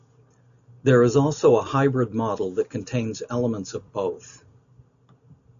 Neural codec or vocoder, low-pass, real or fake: none; 7.2 kHz; real